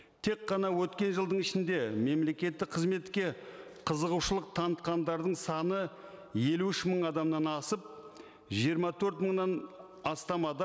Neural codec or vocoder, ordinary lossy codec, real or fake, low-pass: none; none; real; none